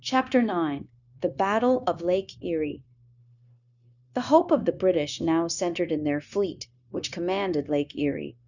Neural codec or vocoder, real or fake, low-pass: codec, 16 kHz, 0.9 kbps, LongCat-Audio-Codec; fake; 7.2 kHz